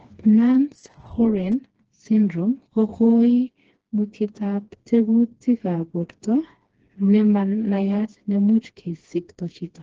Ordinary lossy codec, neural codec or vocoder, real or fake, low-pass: Opus, 16 kbps; codec, 16 kHz, 2 kbps, FreqCodec, smaller model; fake; 7.2 kHz